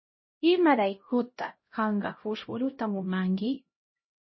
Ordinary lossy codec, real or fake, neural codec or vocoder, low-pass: MP3, 24 kbps; fake; codec, 16 kHz, 0.5 kbps, X-Codec, HuBERT features, trained on LibriSpeech; 7.2 kHz